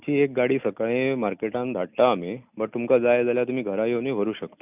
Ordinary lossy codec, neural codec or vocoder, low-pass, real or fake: none; none; 3.6 kHz; real